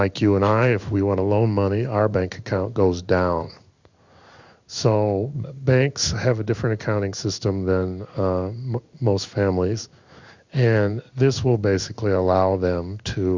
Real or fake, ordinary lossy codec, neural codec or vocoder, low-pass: fake; Opus, 64 kbps; codec, 16 kHz in and 24 kHz out, 1 kbps, XY-Tokenizer; 7.2 kHz